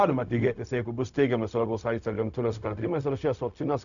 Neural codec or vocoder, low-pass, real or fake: codec, 16 kHz, 0.4 kbps, LongCat-Audio-Codec; 7.2 kHz; fake